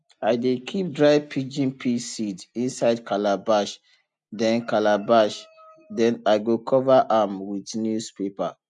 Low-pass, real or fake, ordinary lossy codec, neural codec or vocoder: 10.8 kHz; real; MP3, 64 kbps; none